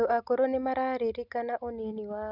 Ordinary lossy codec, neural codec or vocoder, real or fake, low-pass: none; none; real; 5.4 kHz